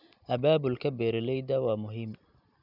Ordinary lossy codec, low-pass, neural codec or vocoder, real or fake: none; 5.4 kHz; none; real